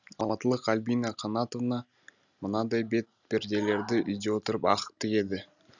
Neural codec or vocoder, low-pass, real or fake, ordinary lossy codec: none; 7.2 kHz; real; none